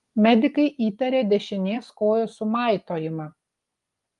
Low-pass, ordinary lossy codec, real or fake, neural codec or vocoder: 10.8 kHz; Opus, 32 kbps; fake; vocoder, 24 kHz, 100 mel bands, Vocos